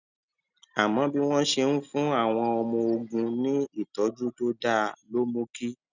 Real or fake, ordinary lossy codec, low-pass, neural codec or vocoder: real; none; 7.2 kHz; none